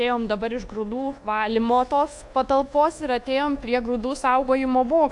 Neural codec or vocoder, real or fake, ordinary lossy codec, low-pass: codec, 24 kHz, 1.2 kbps, DualCodec; fake; AAC, 64 kbps; 10.8 kHz